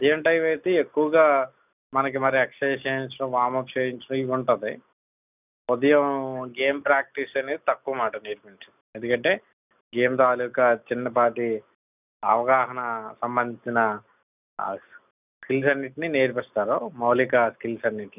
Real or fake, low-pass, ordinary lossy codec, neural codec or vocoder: real; 3.6 kHz; none; none